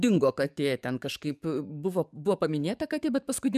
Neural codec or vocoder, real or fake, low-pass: codec, 44.1 kHz, 7.8 kbps, DAC; fake; 14.4 kHz